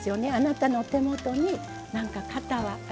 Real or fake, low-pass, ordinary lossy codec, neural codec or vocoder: real; none; none; none